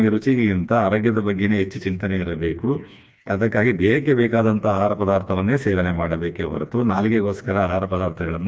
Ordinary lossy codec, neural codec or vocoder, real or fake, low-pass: none; codec, 16 kHz, 2 kbps, FreqCodec, smaller model; fake; none